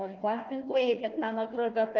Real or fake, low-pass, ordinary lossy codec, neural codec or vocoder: fake; 7.2 kHz; Opus, 24 kbps; codec, 16 kHz, 1 kbps, FunCodec, trained on Chinese and English, 50 frames a second